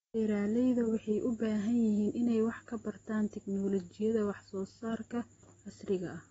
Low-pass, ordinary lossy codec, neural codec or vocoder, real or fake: 19.8 kHz; AAC, 24 kbps; none; real